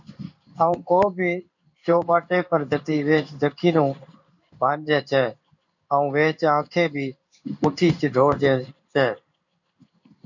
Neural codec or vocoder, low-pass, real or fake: codec, 16 kHz in and 24 kHz out, 1 kbps, XY-Tokenizer; 7.2 kHz; fake